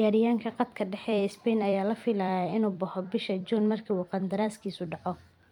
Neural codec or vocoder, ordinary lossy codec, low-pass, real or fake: vocoder, 44.1 kHz, 128 mel bands every 256 samples, BigVGAN v2; none; 19.8 kHz; fake